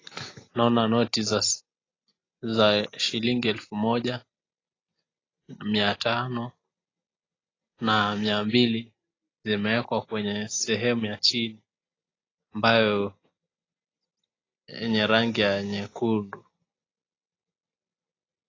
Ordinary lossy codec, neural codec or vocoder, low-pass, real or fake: AAC, 32 kbps; none; 7.2 kHz; real